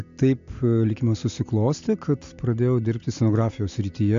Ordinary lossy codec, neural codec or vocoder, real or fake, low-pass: AAC, 64 kbps; none; real; 7.2 kHz